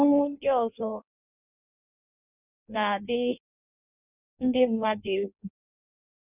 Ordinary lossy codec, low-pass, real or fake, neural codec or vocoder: none; 3.6 kHz; fake; codec, 16 kHz in and 24 kHz out, 0.6 kbps, FireRedTTS-2 codec